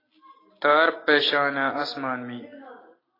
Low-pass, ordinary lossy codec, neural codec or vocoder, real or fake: 5.4 kHz; AAC, 24 kbps; none; real